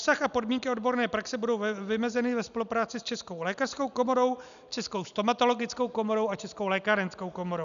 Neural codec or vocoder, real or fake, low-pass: none; real; 7.2 kHz